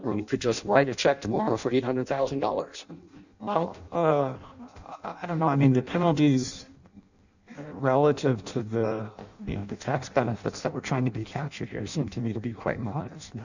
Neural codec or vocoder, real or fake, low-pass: codec, 16 kHz in and 24 kHz out, 0.6 kbps, FireRedTTS-2 codec; fake; 7.2 kHz